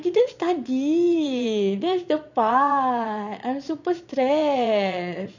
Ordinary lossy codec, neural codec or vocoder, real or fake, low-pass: none; vocoder, 22.05 kHz, 80 mel bands, Vocos; fake; 7.2 kHz